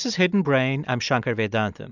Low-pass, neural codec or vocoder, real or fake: 7.2 kHz; none; real